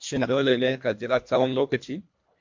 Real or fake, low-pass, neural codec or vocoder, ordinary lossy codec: fake; 7.2 kHz; codec, 24 kHz, 1.5 kbps, HILCodec; MP3, 48 kbps